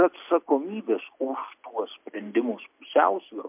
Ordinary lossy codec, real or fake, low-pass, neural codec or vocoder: MP3, 32 kbps; real; 3.6 kHz; none